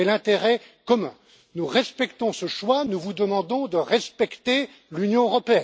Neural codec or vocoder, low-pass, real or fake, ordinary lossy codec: none; none; real; none